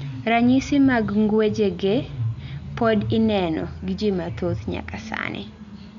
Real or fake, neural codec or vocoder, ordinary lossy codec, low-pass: real; none; none; 7.2 kHz